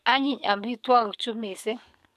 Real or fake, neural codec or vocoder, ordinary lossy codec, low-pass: fake; codec, 44.1 kHz, 7.8 kbps, DAC; AAC, 96 kbps; 14.4 kHz